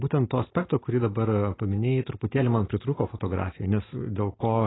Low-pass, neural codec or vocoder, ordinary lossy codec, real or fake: 7.2 kHz; none; AAC, 16 kbps; real